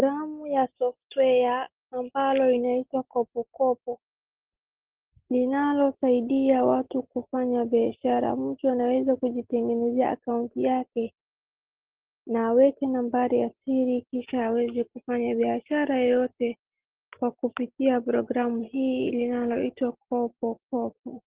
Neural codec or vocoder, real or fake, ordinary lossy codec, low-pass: none; real; Opus, 16 kbps; 3.6 kHz